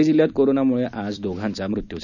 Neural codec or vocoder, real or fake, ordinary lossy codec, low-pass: none; real; none; 7.2 kHz